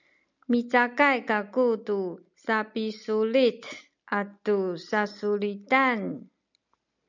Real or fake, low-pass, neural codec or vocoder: real; 7.2 kHz; none